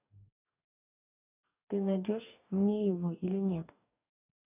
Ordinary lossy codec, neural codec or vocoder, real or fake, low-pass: none; codec, 44.1 kHz, 2.6 kbps, DAC; fake; 3.6 kHz